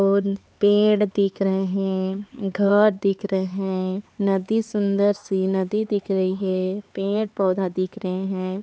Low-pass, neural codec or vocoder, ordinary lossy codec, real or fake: none; codec, 16 kHz, 4 kbps, X-Codec, HuBERT features, trained on LibriSpeech; none; fake